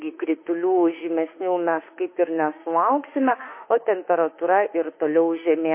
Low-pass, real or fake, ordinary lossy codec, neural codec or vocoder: 3.6 kHz; fake; MP3, 24 kbps; autoencoder, 48 kHz, 32 numbers a frame, DAC-VAE, trained on Japanese speech